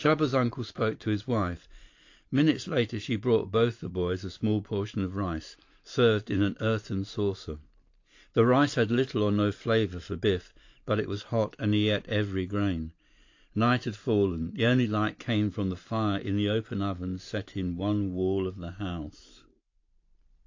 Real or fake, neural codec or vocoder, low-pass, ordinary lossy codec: fake; vocoder, 44.1 kHz, 128 mel bands every 512 samples, BigVGAN v2; 7.2 kHz; AAC, 48 kbps